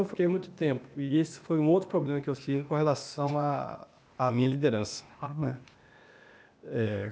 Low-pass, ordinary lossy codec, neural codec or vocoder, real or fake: none; none; codec, 16 kHz, 0.8 kbps, ZipCodec; fake